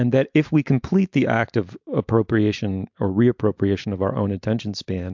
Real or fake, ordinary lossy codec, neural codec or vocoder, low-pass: real; MP3, 64 kbps; none; 7.2 kHz